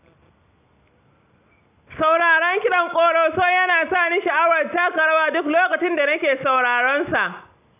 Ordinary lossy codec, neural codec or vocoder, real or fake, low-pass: none; none; real; 3.6 kHz